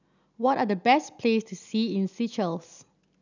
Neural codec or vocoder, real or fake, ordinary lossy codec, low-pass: none; real; none; 7.2 kHz